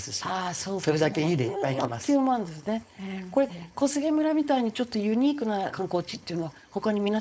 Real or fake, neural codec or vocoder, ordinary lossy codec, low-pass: fake; codec, 16 kHz, 4.8 kbps, FACodec; none; none